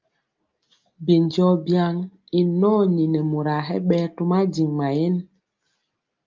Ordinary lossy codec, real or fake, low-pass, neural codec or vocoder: Opus, 32 kbps; real; 7.2 kHz; none